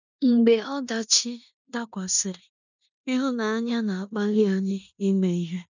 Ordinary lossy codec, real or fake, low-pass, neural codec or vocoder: none; fake; 7.2 kHz; codec, 16 kHz in and 24 kHz out, 0.9 kbps, LongCat-Audio-Codec, four codebook decoder